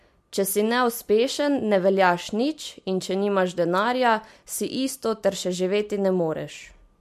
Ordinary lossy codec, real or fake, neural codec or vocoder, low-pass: MP3, 64 kbps; real; none; 14.4 kHz